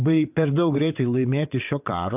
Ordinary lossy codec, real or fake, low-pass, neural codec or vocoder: AAC, 32 kbps; fake; 3.6 kHz; vocoder, 22.05 kHz, 80 mel bands, WaveNeXt